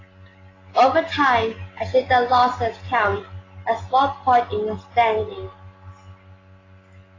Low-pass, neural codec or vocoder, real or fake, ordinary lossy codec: 7.2 kHz; none; real; AAC, 32 kbps